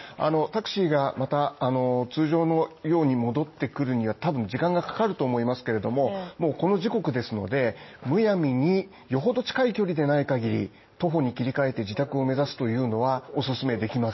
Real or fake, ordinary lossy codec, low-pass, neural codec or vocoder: real; MP3, 24 kbps; 7.2 kHz; none